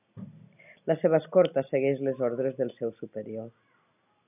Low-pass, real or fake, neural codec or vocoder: 3.6 kHz; real; none